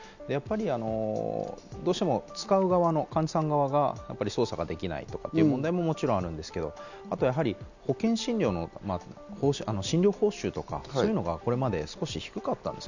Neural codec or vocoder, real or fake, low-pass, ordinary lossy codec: none; real; 7.2 kHz; none